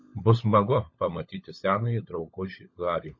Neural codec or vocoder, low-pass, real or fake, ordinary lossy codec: codec, 16 kHz, 16 kbps, FunCodec, trained on LibriTTS, 50 frames a second; 7.2 kHz; fake; MP3, 32 kbps